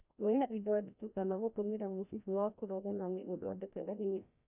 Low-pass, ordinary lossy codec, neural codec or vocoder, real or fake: 3.6 kHz; none; codec, 16 kHz, 1 kbps, FreqCodec, larger model; fake